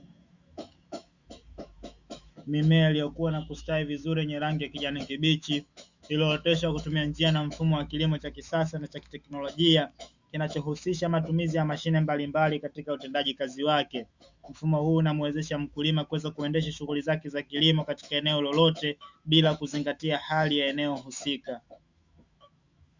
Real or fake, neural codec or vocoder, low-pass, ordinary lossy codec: fake; autoencoder, 48 kHz, 128 numbers a frame, DAC-VAE, trained on Japanese speech; 7.2 kHz; Opus, 64 kbps